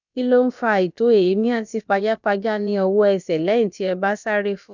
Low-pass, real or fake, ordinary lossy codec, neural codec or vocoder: 7.2 kHz; fake; none; codec, 16 kHz, about 1 kbps, DyCAST, with the encoder's durations